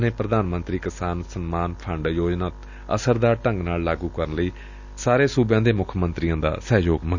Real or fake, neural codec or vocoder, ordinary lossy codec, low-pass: real; none; none; 7.2 kHz